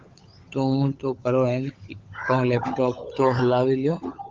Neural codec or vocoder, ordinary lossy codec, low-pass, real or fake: codec, 16 kHz, 16 kbps, FunCodec, trained on LibriTTS, 50 frames a second; Opus, 24 kbps; 7.2 kHz; fake